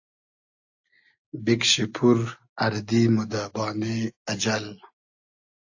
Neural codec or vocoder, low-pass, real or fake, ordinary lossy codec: none; 7.2 kHz; real; AAC, 48 kbps